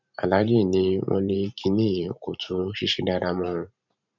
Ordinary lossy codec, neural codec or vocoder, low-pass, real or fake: none; vocoder, 44.1 kHz, 128 mel bands every 512 samples, BigVGAN v2; 7.2 kHz; fake